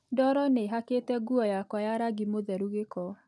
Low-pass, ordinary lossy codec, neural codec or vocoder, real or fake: none; none; none; real